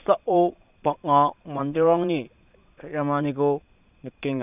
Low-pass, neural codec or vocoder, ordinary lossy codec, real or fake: 3.6 kHz; vocoder, 22.05 kHz, 80 mel bands, Vocos; none; fake